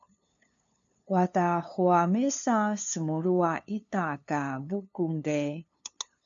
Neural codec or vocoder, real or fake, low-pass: codec, 16 kHz, 2 kbps, FunCodec, trained on LibriTTS, 25 frames a second; fake; 7.2 kHz